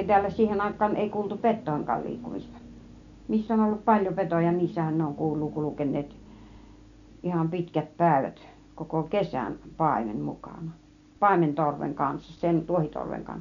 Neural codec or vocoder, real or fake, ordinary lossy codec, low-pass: none; real; none; 7.2 kHz